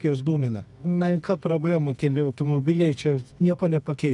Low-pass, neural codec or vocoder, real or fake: 10.8 kHz; codec, 24 kHz, 0.9 kbps, WavTokenizer, medium music audio release; fake